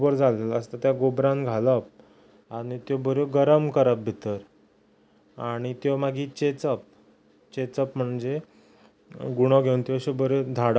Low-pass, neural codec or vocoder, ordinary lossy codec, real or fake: none; none; none; real